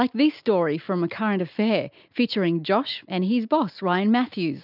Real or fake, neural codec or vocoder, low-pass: real; none; 5.4 kHz